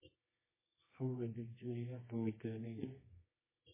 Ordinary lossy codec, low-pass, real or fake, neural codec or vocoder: MP3, 16 kbps; 3.6 kHz; fake; codec, 24 kHz, 0.9 kbps, WavTokenizer, medium music audio release